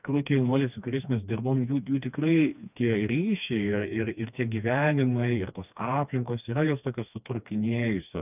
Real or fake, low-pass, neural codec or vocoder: fake; 3.6 kHz; codec, 16 kHz, 2 kbps, FreqCodec, smaller model